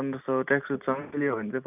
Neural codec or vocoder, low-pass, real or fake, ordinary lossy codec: none; 3.6 kHz; real; none